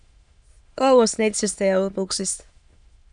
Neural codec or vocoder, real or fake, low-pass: autoencoder, 22.05 kHz, a latent of 192 numbers a frame, VITS, trained on many speakers; fake; 9.9 kHz